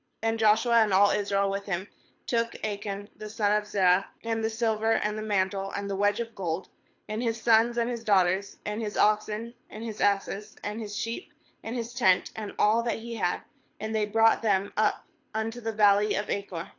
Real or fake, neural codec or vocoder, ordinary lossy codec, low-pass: fake; codec, 24 kHz, 6 kbps, HILCodec; AAC, 48 kbps; 7.2 kHz